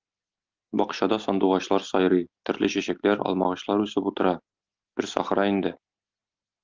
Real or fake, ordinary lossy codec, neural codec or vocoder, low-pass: real; Opus, 32 kbps; none; 7.2 kHz